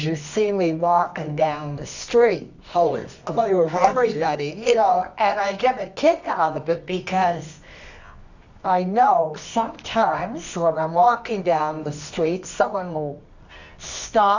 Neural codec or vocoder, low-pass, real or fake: codec, 24 kHz, 0.9 kbps, WavTokenizer, medium music audio release; 7.2 kHz; fake